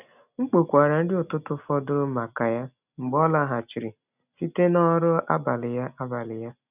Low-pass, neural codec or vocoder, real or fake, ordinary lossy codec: 3.6 kHz; none; real; none